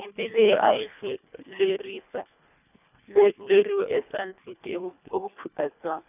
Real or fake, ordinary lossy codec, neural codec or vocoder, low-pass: fake; none; codec, 24 kHz, 1.5 kbps, HILCodec; 3.6 kHz